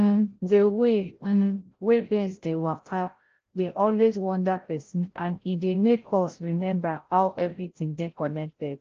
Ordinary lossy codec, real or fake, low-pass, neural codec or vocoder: Opus, 16 kbps; fake; 7.2 kHz; codec, 16 kHz, 0.5 kbps, FreqCodec, larger model